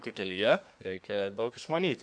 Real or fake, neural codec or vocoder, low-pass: fake; codec, 24 kHz, 1 kbps, SNAC; 9.9 kHz